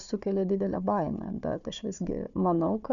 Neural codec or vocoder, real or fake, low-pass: codec, 16 kHz, 4 kbps, FunCodec, trained on Chinese and English, 50 frames a second; fake; 7.2 kHz